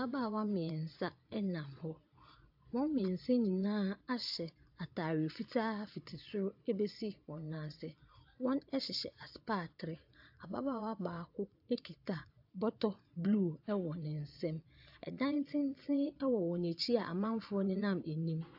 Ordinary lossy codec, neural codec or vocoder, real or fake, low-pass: AAC, 48 kbps; vocoder, 22.05 kHz, 80 mel bands, Vocos; fake; 5.4 kHz